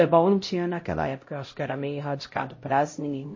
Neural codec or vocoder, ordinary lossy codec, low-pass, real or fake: codec, 16 kHz, 0.5 kbps, X-Codec, HuBERT features, trained on LibriSpeech; MP3, 32 kbps; 7.2 kHz; fake